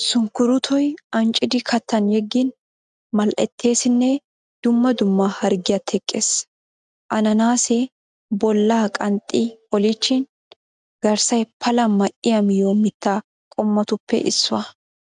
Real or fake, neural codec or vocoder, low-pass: real; none; 9.9 kHz